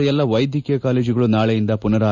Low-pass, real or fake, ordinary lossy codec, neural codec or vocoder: 7.2 kHz; real; none; none